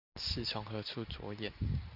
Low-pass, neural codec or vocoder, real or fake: 5.4 kHz; none; real